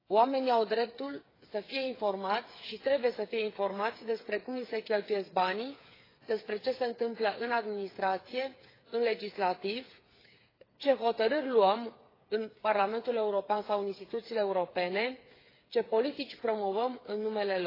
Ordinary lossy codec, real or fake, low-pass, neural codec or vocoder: AAC, 24 kbps; fake; 5.4 kHz; codec, 16 kHz, 8 kbps, FreqCodec, smaller model